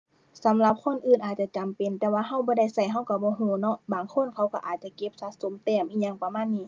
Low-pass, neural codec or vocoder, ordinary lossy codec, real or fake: 7.2 kHz; none; Opus, 24 kbps; real